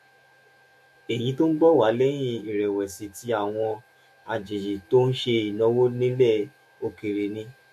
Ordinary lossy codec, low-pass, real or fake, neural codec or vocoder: MP3, 64 kbps; 14.4 kHz; fake; autoencoder, 48 kHz, 128 numbers a frame, DAC-VAE, trained on Japanese speech